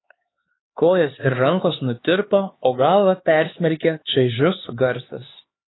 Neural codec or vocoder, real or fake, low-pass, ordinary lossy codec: codec, 16 kHz, 4 kbps, X-Codec, HuBERT features, trained on LibriSpeech; fake; 7.2 kHz; AAC, 16 kbps